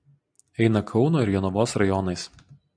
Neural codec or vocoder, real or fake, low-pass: none; real; 9.9 kHz